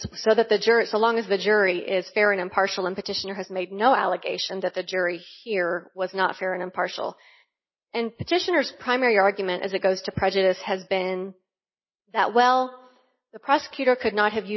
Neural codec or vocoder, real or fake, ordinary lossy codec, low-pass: none; real; MP3, 24 kbps; 7.2 kHz